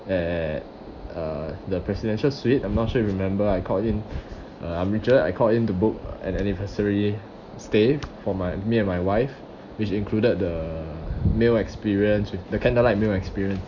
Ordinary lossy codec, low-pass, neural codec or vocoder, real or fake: Opus, 64 kbps; 7.2 kHz; none; real